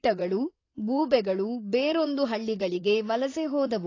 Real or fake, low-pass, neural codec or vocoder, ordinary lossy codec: fake; 7.2 kHz; vocoder, 44.1 kHz, 128 mel bands, Pupu-Vocoder; AAC, 32 kbps